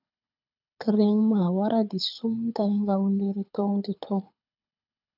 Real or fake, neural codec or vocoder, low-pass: fake; codec, 24 kHz, 6 kbps, HILCodec; 5.4 kHz